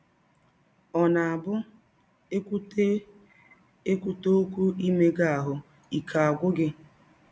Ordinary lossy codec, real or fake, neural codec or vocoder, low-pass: none; real; none; none